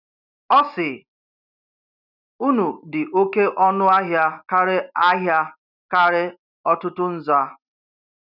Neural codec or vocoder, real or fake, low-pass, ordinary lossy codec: none; real; 5.4 kHz; none